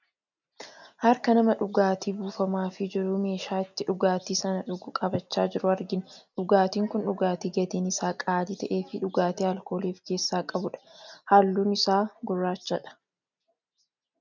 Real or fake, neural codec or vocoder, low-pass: real; none; 7.2 kHz